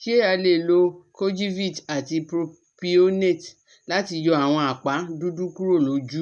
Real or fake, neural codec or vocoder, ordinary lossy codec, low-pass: real; none; none; 10.8 kHz